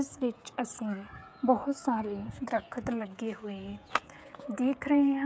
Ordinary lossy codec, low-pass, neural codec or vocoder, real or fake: none; none; codec, 16 kHz, 16 kbps, FreqCodec, smaller model; fake